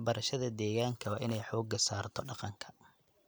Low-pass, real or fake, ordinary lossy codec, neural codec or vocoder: none; real; none; none